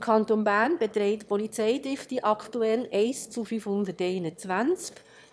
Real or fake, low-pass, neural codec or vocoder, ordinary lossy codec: fake; none; autoencoder, 22.05 kHz, a latent of 192 numbers a frame, VITS, trained on one speaker; none